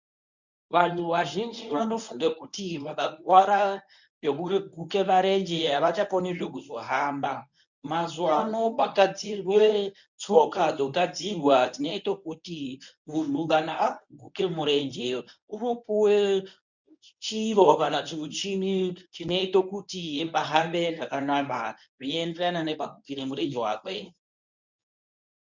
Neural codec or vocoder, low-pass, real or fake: codec, 24 kHz, 0.9 kbps, WavTokenizer, medium speech release version 1; 7.2 kHz; fake